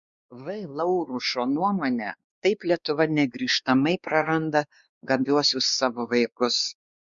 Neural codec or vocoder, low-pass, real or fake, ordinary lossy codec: codec, 16 kHz, 2 kbps, X-Codec, WavLM features, trained on Multilingual LibriSpeech; 7.2 kHz; fake; Opus, 64 kbps